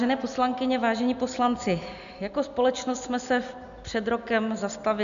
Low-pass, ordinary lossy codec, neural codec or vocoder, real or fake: 7.2 kHz; AAC, 96 kbps; none; real